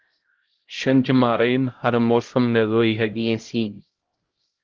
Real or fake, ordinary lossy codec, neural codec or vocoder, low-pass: fake; Opus, 16 kbps; codec, 16 kHz, 0.5 kbps, X-Codec, HuBERT features, trained on LibriSpeech; 7.2 kHz